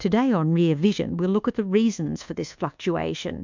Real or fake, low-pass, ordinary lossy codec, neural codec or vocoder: fake; 7.2 kHz; MP3, 64 kbps; codec, 24 kHz, 1.2 kbps, DualCodec